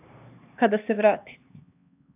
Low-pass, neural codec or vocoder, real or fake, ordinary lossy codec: 3.6 kHz; codec, 16 kHz, 2 kbps, X-Codec, HuBERT features, trained on LibriSpeech; fake; AAC, 32 kbps